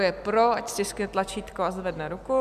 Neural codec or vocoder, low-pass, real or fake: none; 14.4 kHz; real